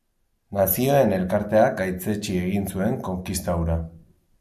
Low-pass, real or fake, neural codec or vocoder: 14.4 kHz; real; none